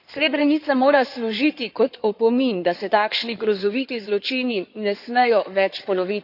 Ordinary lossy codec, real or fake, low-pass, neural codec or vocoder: none; fake; 5.4 kHz; codec, 16 kHz in and 24 kHz out, 2.2 kbps, FireRedTTS-2 codec